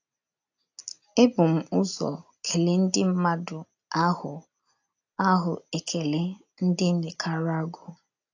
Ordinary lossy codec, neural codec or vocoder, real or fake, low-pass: AAC, 48 kbps; none; real; 7.2 kHz